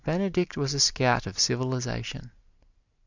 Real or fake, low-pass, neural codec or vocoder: real; 7.2 kHz; none